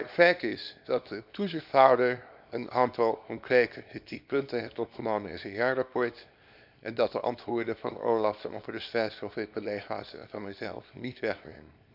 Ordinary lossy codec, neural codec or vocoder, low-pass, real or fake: none; codec, 24 kHz, 0.9 kbps, WavTokenizer, small release; 5.4 kHz; fake